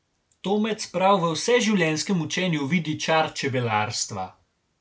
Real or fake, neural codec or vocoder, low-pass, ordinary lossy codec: real; none; none; none